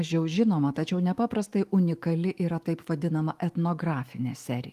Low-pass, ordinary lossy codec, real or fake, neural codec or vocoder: 14.4 kHz; Opus, 24 kbps; fake; autoencoder, 48 kHz, 128 numbers a frame, DAC-VAE, trained on Japanese speech